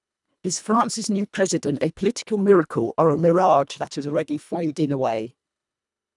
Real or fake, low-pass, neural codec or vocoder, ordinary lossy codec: fake; 10.8 kHz; codec, 24 kHz, 1.5 kbps, HILCodec; none